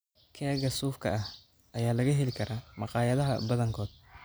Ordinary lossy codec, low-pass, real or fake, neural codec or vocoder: none; none; real; none